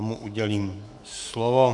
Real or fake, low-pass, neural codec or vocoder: fake; 10.8 kHz; autoencoder, 48 kHz, 128 numbers a frame, DAC-VAE, trained on Japanese speech